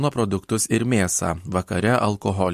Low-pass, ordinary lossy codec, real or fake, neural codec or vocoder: 14.4 kHz; MP3, 64 kbps; real; none